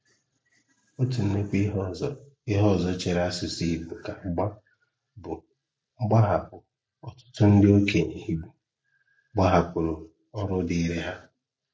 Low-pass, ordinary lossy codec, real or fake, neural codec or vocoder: none; none; real; none